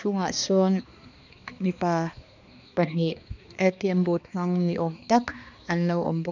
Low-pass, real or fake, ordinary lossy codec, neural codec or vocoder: 7.2 kHz; fake; none; codec, 16 kHz, 2 kbps, X-Codec, HuBERT features, trained on balanced general audio